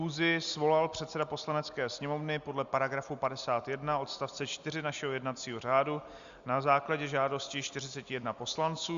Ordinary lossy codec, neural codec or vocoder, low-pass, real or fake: Opus, 64 kbps; none; 7.2 kHz; real